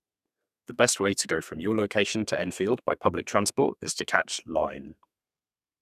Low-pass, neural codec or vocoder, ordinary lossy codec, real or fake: 14.4 kHz; codec, 32 kHz, 1.9 kbps, SNAC; none; fake